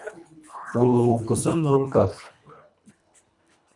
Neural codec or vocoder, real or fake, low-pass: codec, 24 kHz, 1.5 kbps, HILCodec; fake; 10.8 kHz